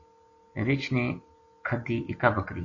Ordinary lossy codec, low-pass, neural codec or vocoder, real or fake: AAC, 32 kbps; 7.2 kHz; none; real